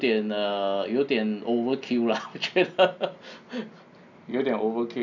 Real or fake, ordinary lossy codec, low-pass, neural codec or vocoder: real; none; 7.2 kHz; none